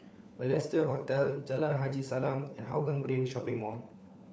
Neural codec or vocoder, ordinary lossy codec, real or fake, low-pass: codec, 16 kHz, 4 kbps, FunCodec, trained on LibriTTS, 50 frames a second; none; fake; none